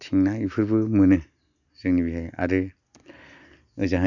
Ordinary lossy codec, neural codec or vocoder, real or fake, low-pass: none; none; real; 7.2 kHz